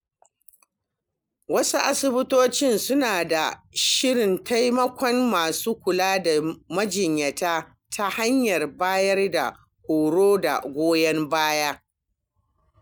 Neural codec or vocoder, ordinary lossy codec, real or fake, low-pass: none; none; real; none